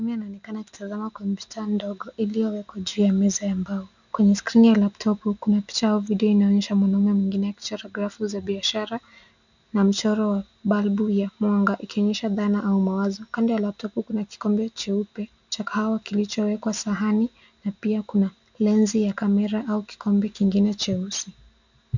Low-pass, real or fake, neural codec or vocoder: 7.2 kHz; real; none